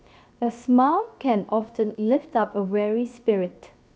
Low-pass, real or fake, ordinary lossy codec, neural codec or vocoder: none; fake; none; codec, 16 kHz, 0.7 kbps, FocalCodec